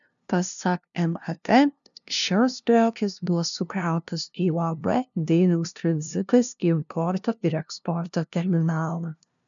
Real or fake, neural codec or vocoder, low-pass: fake; codec, 16 kHz, 0.5 kbps, FunCodec, trained on LibriTTS, 25 frames a second; 7.2 kHz